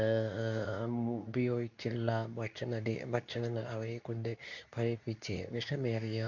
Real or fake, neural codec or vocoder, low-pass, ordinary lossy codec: fake; codec, 24 kHz, 0.9 kbps, WavTokenizer, medium speech release version 2; 7.2 kHz; none